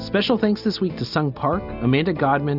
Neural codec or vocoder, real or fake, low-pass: none; real; 5.4 kHz